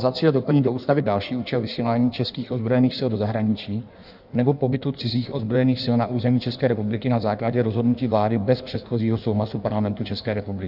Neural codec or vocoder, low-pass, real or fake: codec, 16 kHz in and 24 kHz out, 1.1 kbps, FireRedTTS-2 codec; 5.4 kHz; fake